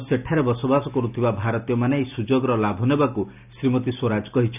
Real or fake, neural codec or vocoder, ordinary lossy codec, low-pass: real; none; none; 3.6 kHz